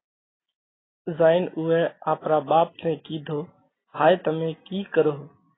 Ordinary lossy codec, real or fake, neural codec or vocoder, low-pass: AAC, 16 kbps; real; none; 7.2 kHz